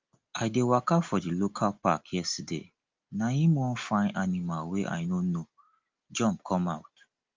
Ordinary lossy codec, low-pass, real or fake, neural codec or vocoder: Opus, 24 kbps; 7.2 kHz; real; none